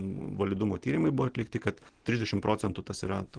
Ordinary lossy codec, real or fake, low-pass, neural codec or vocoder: Opus, 16 kbps; real; 9.9 kHz; none